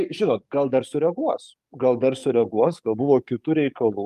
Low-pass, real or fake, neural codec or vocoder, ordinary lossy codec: 14.4 kHz; fake; codec, 44.1 kHz, 7.8 kbps, DAC; Opus, 32 kbps